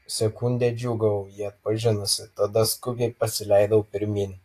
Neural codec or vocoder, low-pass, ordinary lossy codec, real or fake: none; 14.4 kHz; AAC, 48 kbps; real